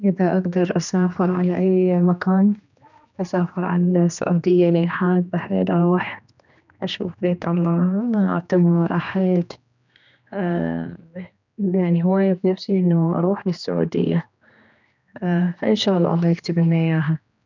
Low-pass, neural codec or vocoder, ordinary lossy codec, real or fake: 7.2 kHz; codec, 16 kHz, 2 kbps, X-Codec, HuBERT features, trained on general audio; none; fake